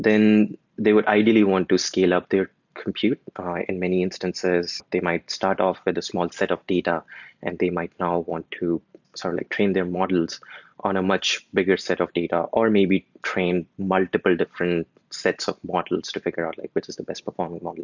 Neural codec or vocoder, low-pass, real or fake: none; 7.2 kHz; real